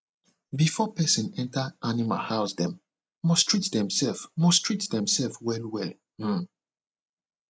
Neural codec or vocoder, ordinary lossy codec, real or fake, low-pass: none; none; real; none